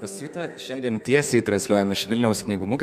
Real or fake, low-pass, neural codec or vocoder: fake; 14.4 kHz; codec, 32 kHz, 1.9 kbps, SNAC